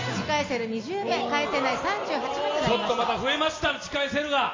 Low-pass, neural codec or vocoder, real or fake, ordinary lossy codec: 7.2 kHz; none; real; AAC, 32 kbps